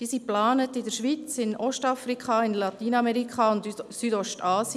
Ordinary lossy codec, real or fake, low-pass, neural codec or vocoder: none; real; none; none